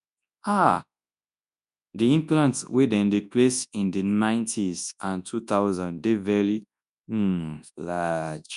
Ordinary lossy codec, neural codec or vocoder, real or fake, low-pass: none; codec, 24 kHz, 0.9 kbps, WavTokenizer, large speech release; fake; 10.8 kHz